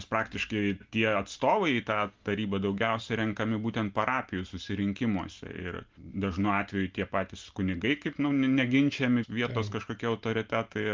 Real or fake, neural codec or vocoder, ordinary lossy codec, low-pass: real; none; Opus, 24 kbps; 7.2 kHz